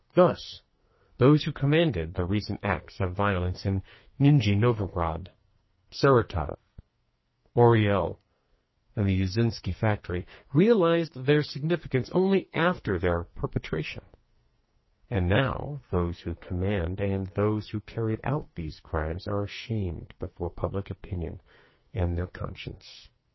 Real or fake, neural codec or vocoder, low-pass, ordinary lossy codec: fake; codec, 44.1 kHz, 2.6 kbps, SNAC; 7.2 kHz; MP3, 24 kbps